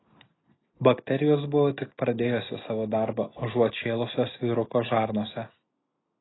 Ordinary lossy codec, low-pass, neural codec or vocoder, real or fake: AAC, 16 kbps; 7.2 kHz; none; real